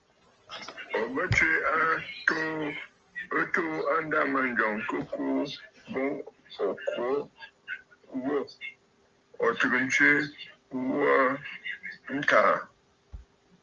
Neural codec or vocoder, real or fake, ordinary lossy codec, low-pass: none; real; Opus, 24 kbps; 7.2 kHz